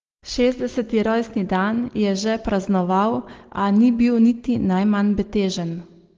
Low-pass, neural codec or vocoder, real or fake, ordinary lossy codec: 7.2 kHz; none; real; Opus, 16 kbps